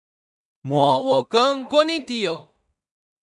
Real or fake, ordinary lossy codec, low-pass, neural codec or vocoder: fake; MP3, 96 kbps; 10.8 kHz; codec, 16 kHz in and 24 kHz out, 0.4 kbps, LongCat-Audio-Codec, two codebook decoder